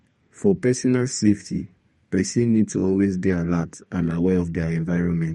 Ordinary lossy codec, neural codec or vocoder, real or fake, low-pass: MP3, 48 kbps; codec, 32 kHz, 1.9 kbps, SNAC; fake; 14.4 kHz